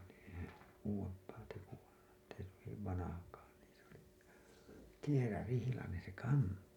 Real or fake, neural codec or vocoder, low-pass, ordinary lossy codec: real; none; 19.8 kHz; none